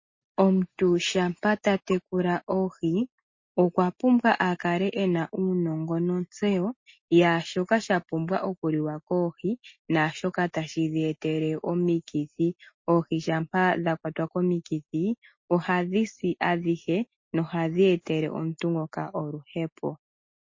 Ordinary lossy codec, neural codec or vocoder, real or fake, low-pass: MP3, 32 kbps; none; real; 7.2 kHz